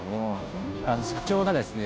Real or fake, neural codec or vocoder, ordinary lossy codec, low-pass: fake; codec, 16 kHz, 0.5 kbps, FunCodec, trained on Chinese and English, 25 frames a second; none; none